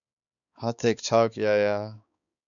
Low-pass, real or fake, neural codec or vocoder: 7.2 kHz; fake; codec, 16 kHz, 4 kbps, X-Codec, HuBERT features, trained on balanced general audio